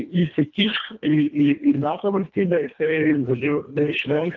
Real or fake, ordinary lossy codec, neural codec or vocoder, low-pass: fake; Opus, 24 kbps; codec, 24 kHz, 1.5 kbps, HILCodec; 7.2 kHz